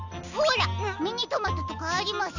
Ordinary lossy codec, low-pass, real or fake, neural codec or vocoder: none; 7.2 kHz; real; none